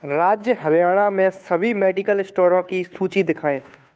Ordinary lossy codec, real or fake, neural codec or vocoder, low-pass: none; fake; codec, 16 kHz, 2 kbps, FunCodec, trained on Chinese and English, 25 frames a second; none